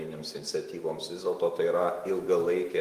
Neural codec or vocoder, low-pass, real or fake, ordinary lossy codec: autoencoder, 48 kHz, 128 numbers a frame, DAC-VAE, trained on Japanese speech; 14.4 kHz; fake; Opus, 24 kbps